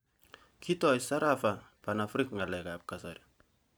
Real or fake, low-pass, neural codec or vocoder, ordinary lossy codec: real; none; none; none